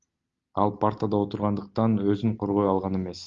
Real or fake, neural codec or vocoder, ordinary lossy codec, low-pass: real; none; Opus, 32 kbps; 7.2 kHz